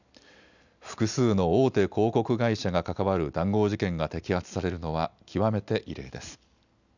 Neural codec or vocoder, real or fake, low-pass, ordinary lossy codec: none; real; 7.2 kHz; none